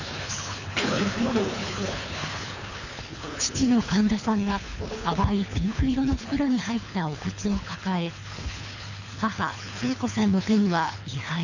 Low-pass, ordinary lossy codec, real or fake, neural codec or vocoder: 7.2 kHz; none; fake; codec, 24 kHz, 3 kbps, HILCodec